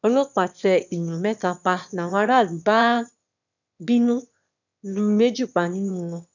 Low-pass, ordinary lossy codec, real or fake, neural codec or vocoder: 7.2 kHz; none; fake; autoencoder, 22.05 kHz, a latent of 192 numbers a frame, VITS, trained on one speaker